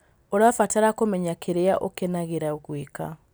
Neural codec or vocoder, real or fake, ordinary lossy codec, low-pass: none; real; none; none